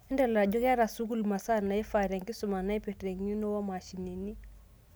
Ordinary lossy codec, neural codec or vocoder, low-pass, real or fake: none; none; none; real